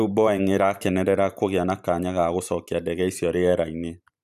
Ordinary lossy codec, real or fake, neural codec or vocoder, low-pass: none; fake; vocoder, 44.1 kHz, 128 mel bands every 512 samples, BigVGAN v2; 14.4 kHz